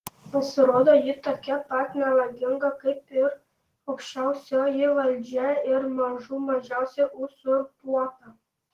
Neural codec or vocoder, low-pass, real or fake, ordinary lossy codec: none; 14.4 kHz; real; Opus, 16 kbps